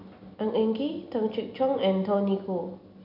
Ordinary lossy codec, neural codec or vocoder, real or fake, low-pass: none; none; real; 5.4 kHz